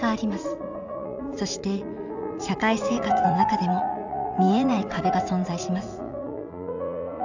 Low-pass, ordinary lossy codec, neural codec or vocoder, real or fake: 7.2 kHz; none; vocoder, 44.1 kHz, 80 mel bands, Vocos; fake